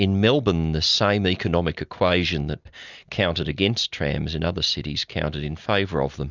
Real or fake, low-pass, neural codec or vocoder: real; 7.2 kHz; none